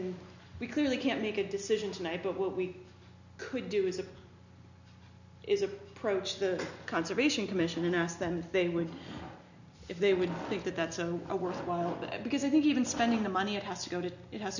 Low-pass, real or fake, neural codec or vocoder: 7.2 kHz; real; none